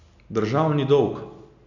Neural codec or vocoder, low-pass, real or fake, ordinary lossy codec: none; 7.2 kHz; real; none